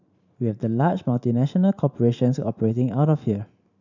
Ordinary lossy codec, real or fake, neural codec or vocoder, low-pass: none; fake; vocoder, 44.1 kHz, 128 mel bands every 512 samples, BigVGAN v2; 7.2 kHz